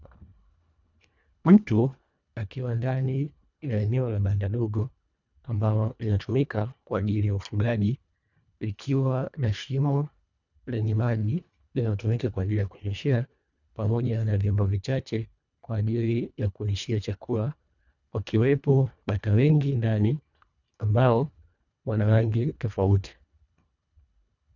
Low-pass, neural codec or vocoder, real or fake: 7.2 kHz; codec, 24 kHz, 1.5 kbps, HILCodec; fake